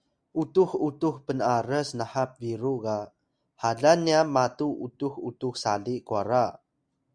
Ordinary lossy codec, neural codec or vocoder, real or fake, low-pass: Opus, 64 kbps; none; real; 9.9 kHz